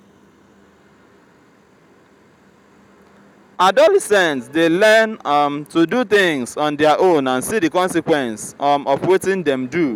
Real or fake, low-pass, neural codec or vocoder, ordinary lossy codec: real; 19.8 kHz; none; none